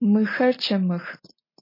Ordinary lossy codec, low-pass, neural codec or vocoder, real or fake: MP3, 24 kbps; 5.4 kHz; none; real